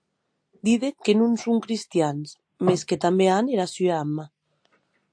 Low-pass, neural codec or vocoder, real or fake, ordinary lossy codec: 9.9 kHz; none; real; AAC, 64 kbps